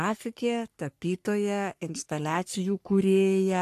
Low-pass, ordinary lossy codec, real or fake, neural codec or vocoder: 14.4 kHz; AAC, 64 kbps; fake; codec, 44.1 kHz, 3.4 kbps, Pupu-Codec